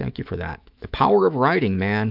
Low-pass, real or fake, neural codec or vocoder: 5.4 kHz; fake; autoencoder, 48 kHz, 128 numbers a frame, DAC-VAE, trained on Japanese speech